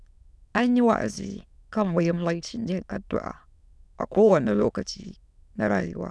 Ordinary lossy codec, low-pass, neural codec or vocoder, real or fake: none; none; autoencoder, 22.05 kHz, a latent of 192 numbers a frame, VITS, trained on many speakers; fake